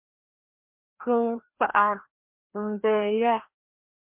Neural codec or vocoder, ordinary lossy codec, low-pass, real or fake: codec, 16 kHz, 1 kbps, FreqCodec, larger model; MP3, 32 kbps; 3.6 kHz; fake